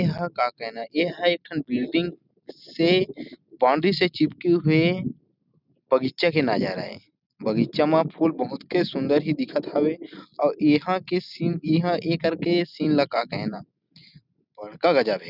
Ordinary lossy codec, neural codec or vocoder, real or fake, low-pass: none; none; real; 5.4 kHz